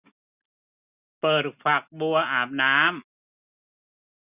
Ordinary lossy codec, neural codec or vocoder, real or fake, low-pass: none; none; real; 3.6 kHz